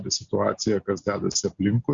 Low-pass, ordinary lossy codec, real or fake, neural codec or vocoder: 7.2 kHz; Opus, 64 kbps; real; none